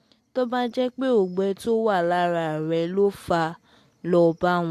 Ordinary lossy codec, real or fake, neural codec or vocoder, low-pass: AAC, 64 kbps; real; none; 14.4 kHz